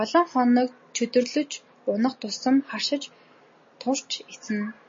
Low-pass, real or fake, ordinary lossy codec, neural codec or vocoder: 7.2 kHz; real; MP3, 32 kbps; none